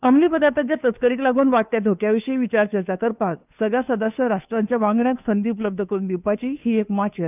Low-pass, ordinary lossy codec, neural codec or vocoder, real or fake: 3.6 kHz; none; codec, 16 kHz, 2 kbps, FunCodec, trained on Chinese and English, 25 frames a second; fake